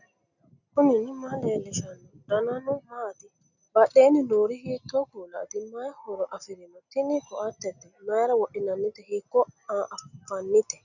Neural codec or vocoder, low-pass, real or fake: none; 7.2 kHz; real